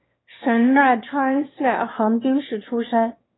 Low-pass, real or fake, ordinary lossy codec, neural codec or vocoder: 7.2 kHz; fake; AAC, 16 kbps; autoencoder, 22.05 kHz, a latent of 192 numbers a frame, VITS, trained on one speaker